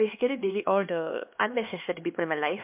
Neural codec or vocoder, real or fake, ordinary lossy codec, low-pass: codec, 16 kHz, 2 kbps, X-Codec, HuBERT features, trained on LibriSpeech; fake; MP3, 32 kbps; 3.6 kHz